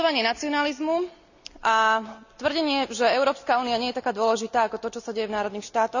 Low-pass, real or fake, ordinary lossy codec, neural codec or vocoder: 7.2 kHz; real; none; none